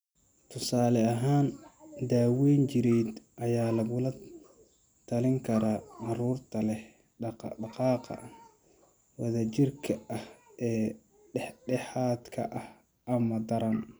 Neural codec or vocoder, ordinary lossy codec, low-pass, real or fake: none; none; none; real